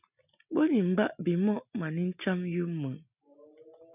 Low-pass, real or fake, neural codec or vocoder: 3.6 kHz; real; none